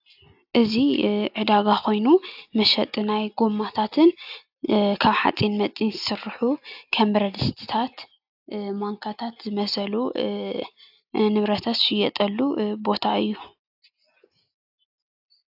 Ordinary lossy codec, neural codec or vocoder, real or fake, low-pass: AAC, 48 kbps; none; real; 5.4 kHz